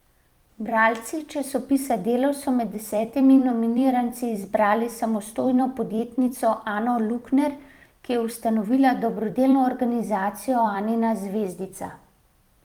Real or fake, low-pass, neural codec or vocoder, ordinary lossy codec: fake; 19.8 kHz; vocoder, 44.1 kHz, 128 mel bands every 256 samples, BigVGAN v2; Opus, 32 kbps